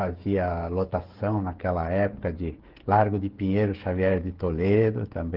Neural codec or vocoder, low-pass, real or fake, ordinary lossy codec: codec, 16 kHz, 16 kbps, FreqCodec, smaller model; 5.4 kHz; fake; Opus, 16 kbps